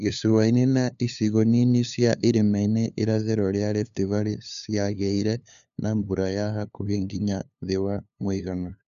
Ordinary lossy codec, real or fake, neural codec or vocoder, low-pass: none; fake; codec, 16 kHz, 2 kbps, FunCodec, trained on LibriTTS, 25 frames a second; 7.2 kHz